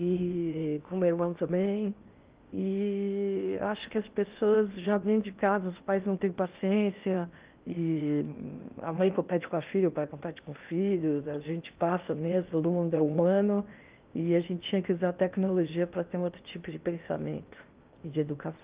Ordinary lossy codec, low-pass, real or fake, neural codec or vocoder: Opus, 32 kbps; 3.6 kHz; fake; codec, 16 kHz in and 24 kHz out, 0.8 kbps, FocalCodec, streaming, 65536 codes